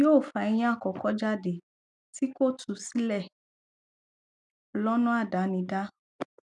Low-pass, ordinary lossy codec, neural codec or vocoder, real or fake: 10.8 kHz; none; none; real